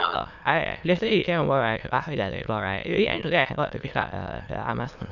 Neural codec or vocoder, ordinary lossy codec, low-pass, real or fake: autoencoder, 22.05 kHz, a latent of 192 numbers a frame, VITS, trained on many speakers; none; 7.2 kHz; fake